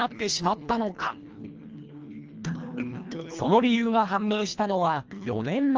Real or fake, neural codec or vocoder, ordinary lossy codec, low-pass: fake; codec, 24 kHz, 1.5 kbps, HILCodec; Opus, 24 kbps; 7.2 kHz